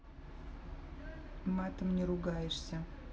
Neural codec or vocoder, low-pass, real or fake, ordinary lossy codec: none; none; real; none